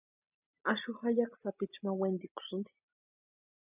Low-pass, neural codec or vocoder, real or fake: 3.6 kHz; none; real